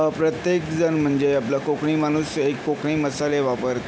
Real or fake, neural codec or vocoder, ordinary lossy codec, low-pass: real; none; none; none